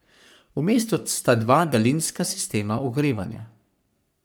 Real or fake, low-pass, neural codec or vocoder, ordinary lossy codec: fake; none; codec, 44.1 kHz, 3.4 kbps, Pupu-Codec; none